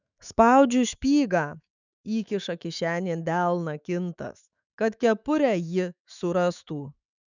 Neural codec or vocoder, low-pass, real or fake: autoencoder, 48 kHz, 128 numbers a frame, DAC-VAE, trained on Japanese speech; 7.2 kHz; fake